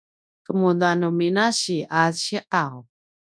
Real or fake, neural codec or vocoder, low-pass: fake; codec, 24 kHz, 0.9 kbps, WavTokenizer, large speech release; 9.9 kHz